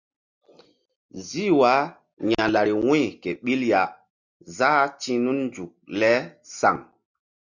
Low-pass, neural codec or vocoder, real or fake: 7.2 kHz; none; real